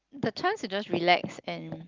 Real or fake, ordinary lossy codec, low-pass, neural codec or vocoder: real; Opus, 24 kbps; 7.2 kHz; none